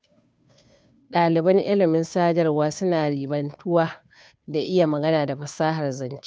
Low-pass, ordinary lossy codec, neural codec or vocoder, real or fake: none; none; codec, 16 kHz, 2 kbps, FunCodec, trained on Chinese and English, 25 frames a second; fake